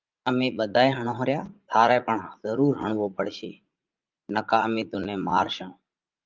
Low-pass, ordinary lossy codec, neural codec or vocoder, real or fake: 7.2 kHz; Opus, 24 kbps; vocoder, 44.1 kHz, 80 mel bands, Vocos; fake